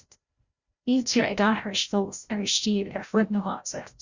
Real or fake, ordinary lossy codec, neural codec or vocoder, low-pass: fake; Opus, 64 kbps; codec, 16 kHz, 0.5 kbps, FreqCodec, larger model; 7.2 kHz